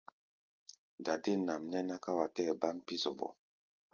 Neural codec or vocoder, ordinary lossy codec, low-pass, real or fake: none; Opus, 24 kbps; 7.2 kHz; real